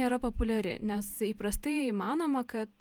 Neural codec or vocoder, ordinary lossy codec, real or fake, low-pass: vocoder, 48 kHz, 128 mel bands, Vocos; Opus, 32 kbps; fake; 19.8 kHz